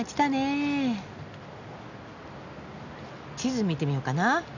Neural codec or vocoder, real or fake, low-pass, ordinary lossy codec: none; real; 7.2 kHz; none